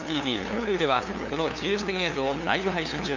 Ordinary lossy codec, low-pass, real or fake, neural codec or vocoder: none; 7.2 kHz; fake; codec, 16 kHz, 2 kbps, FunCodec, trained on LibriTTS, 25 frames a second